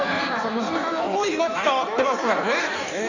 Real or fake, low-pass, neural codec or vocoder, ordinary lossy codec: fake; 7.2 kHz; codec, 16 kHz in and 24 kHz out, 1.1 kbps, FireRedTTS-2 codec; none